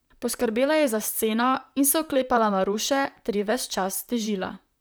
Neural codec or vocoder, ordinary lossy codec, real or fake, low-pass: vocoder, 44.1 kHz, 128 mel bands, Pupu-Vocoder; none; fake; none